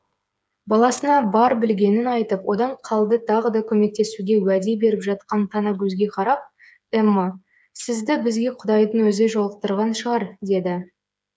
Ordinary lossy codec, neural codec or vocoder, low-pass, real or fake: none; codec, 16 kHz, 8 kbps, FreqCodec, smaller model; none; fake